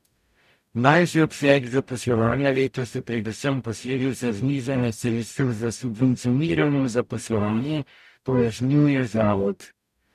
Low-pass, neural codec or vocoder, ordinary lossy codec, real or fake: 14.4 kHz; codec, 44.1 kHz, 0.9 kbps, DAC; none; fake